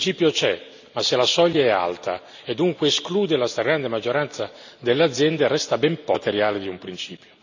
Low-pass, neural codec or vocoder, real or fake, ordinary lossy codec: 7.2 kHz; none; real; none